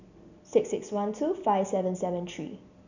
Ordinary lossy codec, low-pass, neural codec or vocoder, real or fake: none; 7.2 kHz; none; real